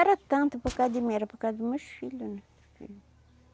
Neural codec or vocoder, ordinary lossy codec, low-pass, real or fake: none; none; none; real